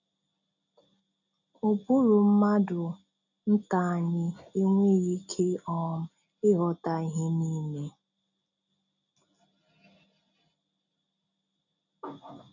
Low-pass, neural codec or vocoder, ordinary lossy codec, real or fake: 7.2 kHz; none; none; real